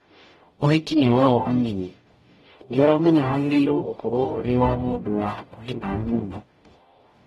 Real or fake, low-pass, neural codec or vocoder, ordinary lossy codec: fake; 19.8 kHz; codec, 44.1 kHz, 0.9 kbps, DAC; AAC, 32 kbps